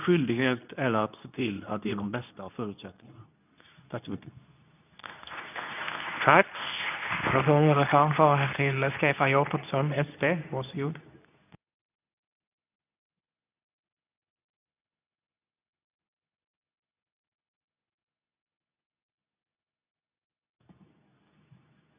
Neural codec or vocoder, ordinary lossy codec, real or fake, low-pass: codec, 24 kHz, 0.9 kbps, WavTokenizer, medium speech release version 2; none; fake; 3.6 kHz